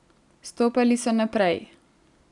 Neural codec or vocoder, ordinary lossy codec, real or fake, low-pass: none; none; real; 10.8 kHz